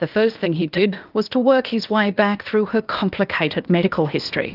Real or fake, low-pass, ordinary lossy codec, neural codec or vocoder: fake; 5.4 kHz; Opus, 24 kbps; codec, 16 kHz, 0.8 kbps, ZipCodec